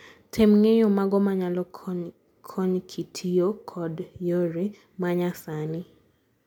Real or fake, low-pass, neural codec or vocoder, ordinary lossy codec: real; 19.8 kHz; none; MP3, 96 kbps